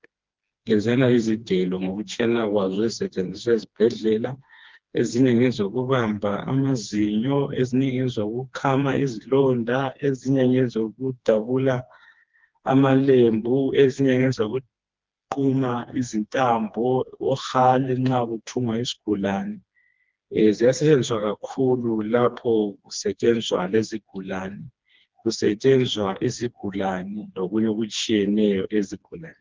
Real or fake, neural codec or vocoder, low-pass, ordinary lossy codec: fake; codec, 16 kHz, 2 kbps, FreqCodec, smaller model; 7.2 kHz; Opus, 32 kbps